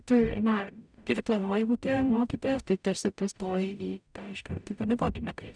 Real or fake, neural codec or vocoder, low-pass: fake; codec, 44.1 kHz, 0.9 kbps, DAC; 9.9 kHz